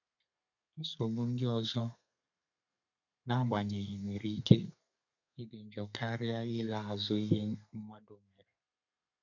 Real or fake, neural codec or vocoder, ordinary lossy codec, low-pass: fake; codec, 32 kHz, 1.9 kbps, SNAC; none; 7.2 kHz